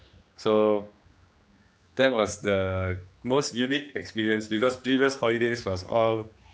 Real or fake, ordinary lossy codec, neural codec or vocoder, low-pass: fake; none; codec, 16 kHz, 2 kbps, X-Codec, HuBERT features, trained on general audio; none